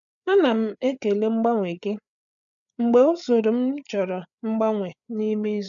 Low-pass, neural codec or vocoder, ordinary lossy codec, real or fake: 7.2 kHz; codec, 16 kHz, 8 kbps, FreqCodec, larger model; none; fake